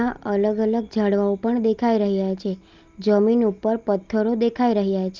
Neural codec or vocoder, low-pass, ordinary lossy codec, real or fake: none; 7.2 kHz; Opus, 24 kbps; real